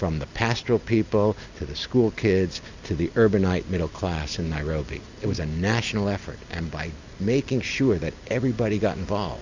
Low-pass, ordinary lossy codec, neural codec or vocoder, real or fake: 7.2 kHz; Opus, 64 kbps; none; real